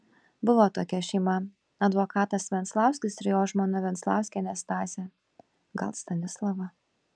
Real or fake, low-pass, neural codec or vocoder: real; 9.9 kHz; none